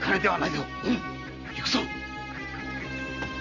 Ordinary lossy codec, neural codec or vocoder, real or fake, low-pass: none; none; real; 7.2 kHz